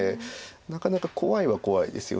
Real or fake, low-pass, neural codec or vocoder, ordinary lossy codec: real; none; none; none